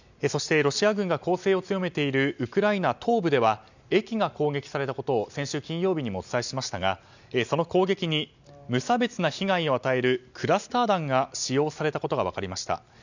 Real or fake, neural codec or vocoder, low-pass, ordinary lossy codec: real; none; 7.2 kHz; none